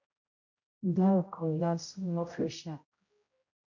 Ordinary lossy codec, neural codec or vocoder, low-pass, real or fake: MP3, 48 kbps; codec, 16 kHz, 0.5 kbps, X-Codec, HuBERT features, trained on general audio; 7.2 kHz; fake